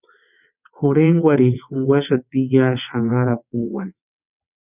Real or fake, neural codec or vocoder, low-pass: fake; vocoder, 22.05 kHz, 80 mel bands, WaveNeXt; 3.6 kHz